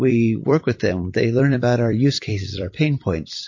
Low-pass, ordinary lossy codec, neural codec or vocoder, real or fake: 7.2 kHz; MP3, 32 kbps; vocoder, 44.1 kHz, 128 mel bands every 256 samples, BigVGAN v2; fake